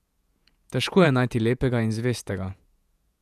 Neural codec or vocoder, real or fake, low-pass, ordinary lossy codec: vocoder, 48 kHz, 128 mel bands, Vocos; fake; 14.4 kHz; none